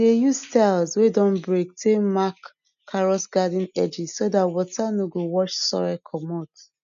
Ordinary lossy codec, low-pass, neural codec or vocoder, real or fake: none; 7.2 kHz; none; real